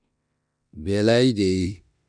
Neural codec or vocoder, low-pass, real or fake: codec, 16 kHz in and 24 kHz out, 0.9 kbps, LongCat-Audio-Codec, four codebook decoder; 9.9 kHz; fake